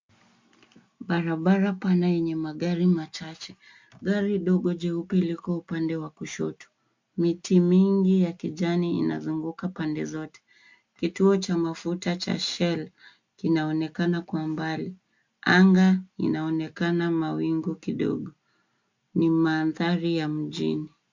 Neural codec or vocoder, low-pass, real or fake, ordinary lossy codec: none; 7.2 kHz; real; MP3, 48 kbps